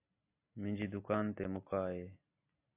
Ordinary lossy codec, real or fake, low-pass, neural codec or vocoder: AAC, 32 kbps; real; 3.6 kHz; none